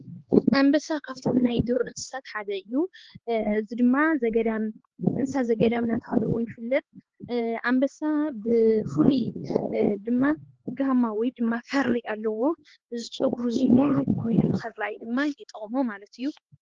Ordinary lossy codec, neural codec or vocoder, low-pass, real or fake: Opus, 16 kbps; codec, 16 kHz, 4 kbps, X-Codec, HuBERT features, trained on LibriSpeech; 7.2 kHz; fake